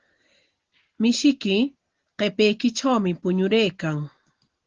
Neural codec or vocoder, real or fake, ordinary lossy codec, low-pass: none; real; Opus, 16 kbps; 7.2 kHz